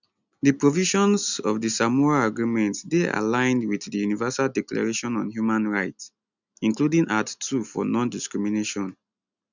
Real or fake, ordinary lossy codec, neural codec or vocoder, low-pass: real; none; none; 7.2 kHz